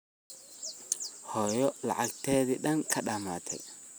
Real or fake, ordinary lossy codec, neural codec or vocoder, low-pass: real; none; none; none